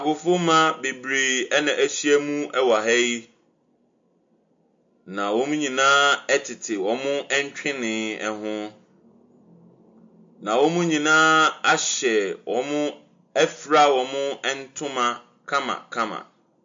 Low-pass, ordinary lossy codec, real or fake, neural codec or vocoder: 7.2 kHz; MP3, 48 kbps; real; none